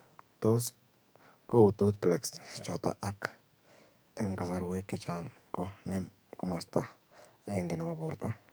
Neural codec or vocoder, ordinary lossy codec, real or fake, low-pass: codec, 44.1 kHz, 2.6 kbps, SNAC; none; fake; none